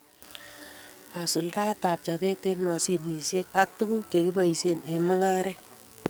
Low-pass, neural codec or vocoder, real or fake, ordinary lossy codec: none; codec, 44.1 kHz, 2.6 kbps, SNAC; fake; none